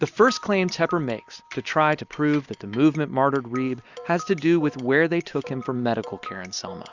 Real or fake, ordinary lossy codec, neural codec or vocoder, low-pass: real; Opus, 64 kbps; none; 7.2 kHz